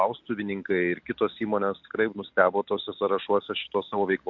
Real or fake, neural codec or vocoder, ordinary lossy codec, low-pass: real; none; MP3, 64 kbps; 7.2 kHz